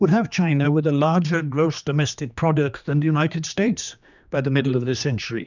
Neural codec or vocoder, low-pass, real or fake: codec, 16 kHz, 2 kbps, X-Codec, HuBERT features, trained on general audio; 7.2 kHz; fake